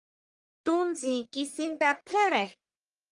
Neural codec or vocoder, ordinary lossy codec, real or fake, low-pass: codec, 44.1 kHz, 1.7 kbps, Pupu-Codec; Opus, 32 kbps; fake; 10.8 kHz